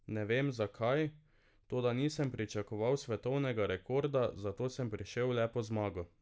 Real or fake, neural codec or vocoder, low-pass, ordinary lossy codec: real; none; none; none